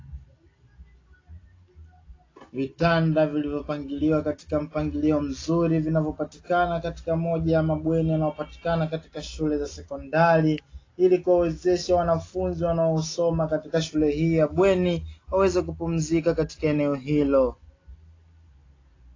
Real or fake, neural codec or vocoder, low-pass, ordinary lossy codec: real; none; 7.2 kHz; AAC, 32 kbps